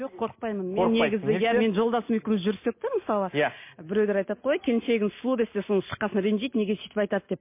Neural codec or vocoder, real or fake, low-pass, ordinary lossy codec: none; real; 3.6 kHz; MP3, 24 kbps